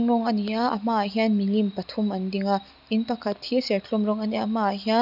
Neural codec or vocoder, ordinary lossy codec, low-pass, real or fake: none; none; 5.4 kHz; real